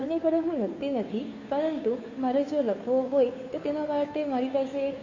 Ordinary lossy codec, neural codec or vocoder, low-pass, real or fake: AAC, 48 kbps; codec, 16 kHz, 2 kbps, FunCodec, trained on Chinese and English, 25 frames a second; 7.2 kHz; fake